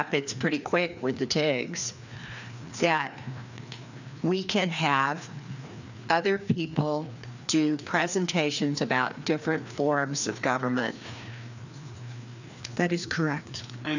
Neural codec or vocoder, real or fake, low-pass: codec, 16 kHz, 2 kbps, FreqCodec, larger model; fake; 7.2 kHz